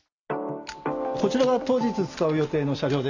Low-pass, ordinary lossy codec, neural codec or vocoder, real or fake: 7.2 kHz; none; none; real